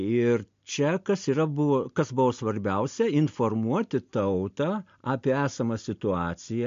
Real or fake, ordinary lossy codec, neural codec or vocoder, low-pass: real; MP3, 48 kbps; none; 7.2 kHz